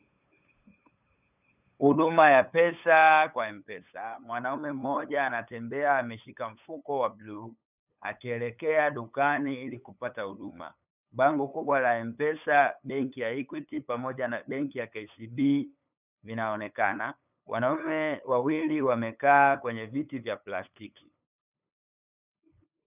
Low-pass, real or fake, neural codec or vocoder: 3.6 kHz; fake; codec, 16 kHz, 8 kbps, FunCodec, trained on LibriTTS, 25 frames a second